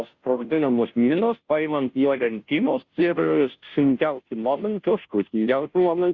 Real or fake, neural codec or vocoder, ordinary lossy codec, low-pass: fake; codec, 16 kHz, 0.5 kbps, FunCodec, trained on Chinese and English, 25 frames a second; Opus, 64 kbps; 7.2 kHz